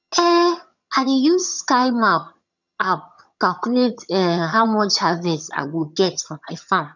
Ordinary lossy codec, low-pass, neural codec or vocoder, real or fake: none; 7.2 kHz; vocoder, 22.05 kHz, 80 mel bands, HiFi-GAN; fake